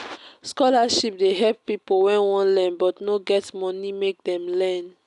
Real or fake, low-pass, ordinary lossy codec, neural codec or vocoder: real; 10.8 kHz; none; none